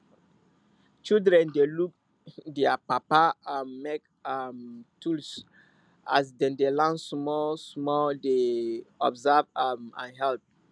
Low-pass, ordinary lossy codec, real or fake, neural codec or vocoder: 9.9 kHz; none; real; none